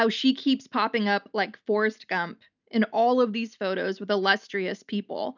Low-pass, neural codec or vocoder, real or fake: 7.2 kHz; none; real